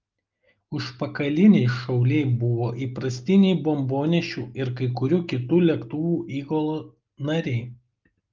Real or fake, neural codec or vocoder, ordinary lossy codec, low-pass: real; none; Opus, 24 kbps; 7.2 kHz